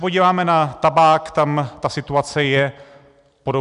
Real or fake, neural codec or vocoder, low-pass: real; none; 10.8 kHz